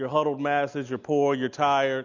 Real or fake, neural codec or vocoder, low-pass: real; none; 7.2 kHz